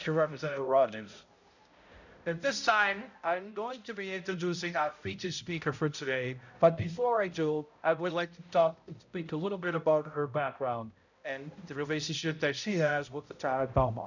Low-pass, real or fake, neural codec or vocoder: 7.2 kHz; fake; codec, 16 kHz, 0.5 kbps, X-Codec, HuBERT features, trained on balanced general audio